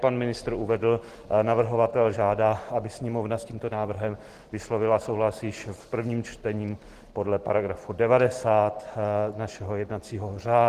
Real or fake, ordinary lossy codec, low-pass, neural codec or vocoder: real; Opus, 16 kbps; 14.4 kHz; none